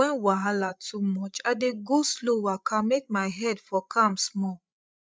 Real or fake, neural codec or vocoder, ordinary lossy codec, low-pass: fake; codec, 16 kHz, 16 kbps, FreqCodec, larger model; none; none